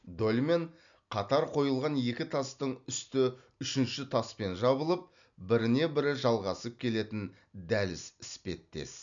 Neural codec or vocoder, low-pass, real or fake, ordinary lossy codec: none; 7.2 kHz; real; MP3, 96 kbps